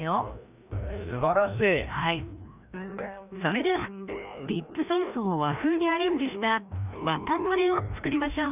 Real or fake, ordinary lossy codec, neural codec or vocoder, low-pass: fake; none; codec, 16 kHz, 1 kbps, FreqCodec, larger model; 3.6 kHz